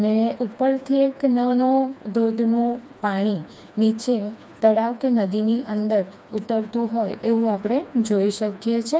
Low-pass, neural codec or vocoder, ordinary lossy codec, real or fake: none; codec, 16 kHz, 2 kbps, FreqCodec, smaller model; none; fake